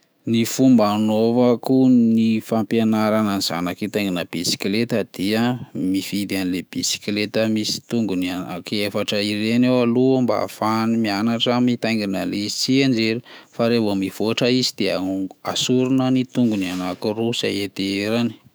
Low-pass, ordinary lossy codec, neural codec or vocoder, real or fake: none; none; autoencoder, 48 kHz, 128 numbers a frame, DAC-VAE, trained on Japanese speech; fake